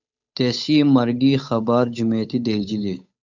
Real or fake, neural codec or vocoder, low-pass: fake; codec, 16 kHz, 8 kbps, FunCodec, trained on Chinese and English, 25 frames a second; 7.2 kHz